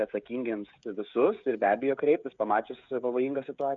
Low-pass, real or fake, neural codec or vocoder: 7.2 kHz; fake; codec, 16 kHz, 16 kbps, FreqCodec, smaller model